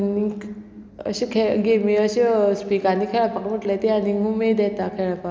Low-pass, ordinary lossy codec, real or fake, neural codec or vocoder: none; none; real; none